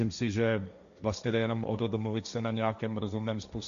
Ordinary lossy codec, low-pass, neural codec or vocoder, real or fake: MP3, 96 kbps; 7.2 kHz; codec, 16 kHz, 1.1 kbps, Voila-Tokenizer; fake